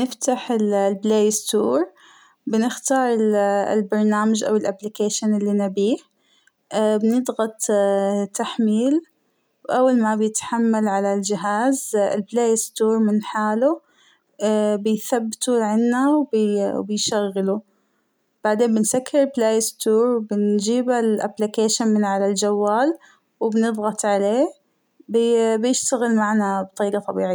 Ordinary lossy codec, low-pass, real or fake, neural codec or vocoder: none; none; real; none